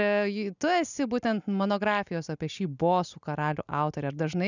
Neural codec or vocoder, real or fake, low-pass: none; real; 7.2 kHz